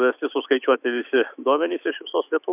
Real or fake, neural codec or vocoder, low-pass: fake; autoencoder, 48 kHz, 128 numbers a frame, DAC-VAE, trained on Japanese speech; 3.6 kHz